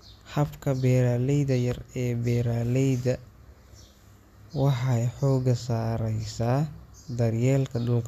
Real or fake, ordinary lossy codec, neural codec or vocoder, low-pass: real; none; none; 14.4 kHz